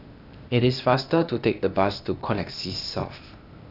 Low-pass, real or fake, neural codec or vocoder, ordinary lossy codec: 5.4 kHz; fake; codec, 16 kHz, 0.8 kbps, ZipCodec; none